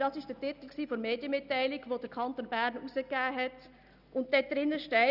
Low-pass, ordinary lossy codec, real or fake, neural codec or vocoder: 5.4 kHz; none; real; none